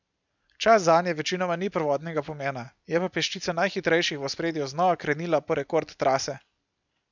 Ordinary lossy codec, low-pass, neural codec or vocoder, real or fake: none; 7.2 kHz; none; real